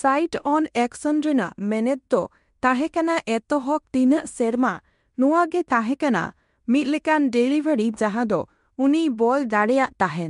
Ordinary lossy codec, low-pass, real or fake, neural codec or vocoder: MP3, 64 kbps; 10.8 kHz; fake; codec, 16 kHz in and 24 kHz out, 0.9 kbps, LongCat-Audio-Codec, fine tuned four codebook decoder